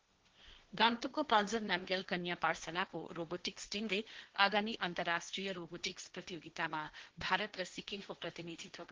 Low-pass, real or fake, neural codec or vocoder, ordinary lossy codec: 7.2 kHz; fake; codec, 16 kHz, 1.1 kbps, Voila-Tokenizer; Opus, 16 kbps